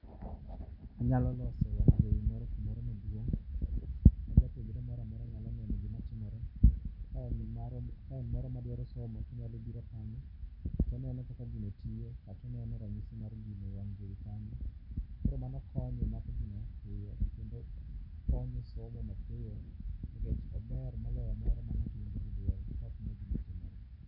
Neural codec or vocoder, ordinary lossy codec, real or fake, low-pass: none; none; real; 5.4 kHz